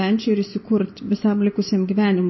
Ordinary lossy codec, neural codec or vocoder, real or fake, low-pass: MP3, 24 kbps; none; real; 7.2 kHz